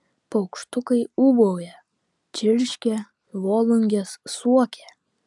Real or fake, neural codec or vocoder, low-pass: real; none; 10.8 kHz